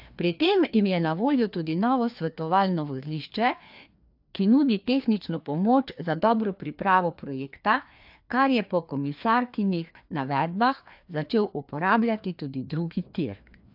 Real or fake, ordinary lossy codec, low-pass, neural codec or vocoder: fake; AAC, 48 kbps; 5.4 kHz; codec, 16 kHz, 2 kbps, FreqCodec, larger model